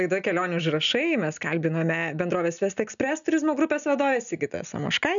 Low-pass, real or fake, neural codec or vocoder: 7.2 kHz; real; none